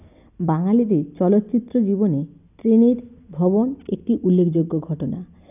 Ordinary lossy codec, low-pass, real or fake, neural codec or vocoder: none; 3.6 kHz; real; none